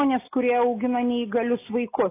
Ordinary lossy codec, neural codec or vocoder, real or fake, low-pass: AAC, 24 kbps; none; real; 3.6 kHz